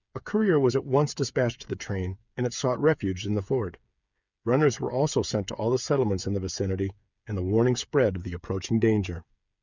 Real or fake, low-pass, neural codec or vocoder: fake; 7.2 kHz; codec, 16 kHz, 8 kbps, FreqCodec, smaller model